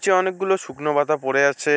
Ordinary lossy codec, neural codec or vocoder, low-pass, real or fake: none; none; none; real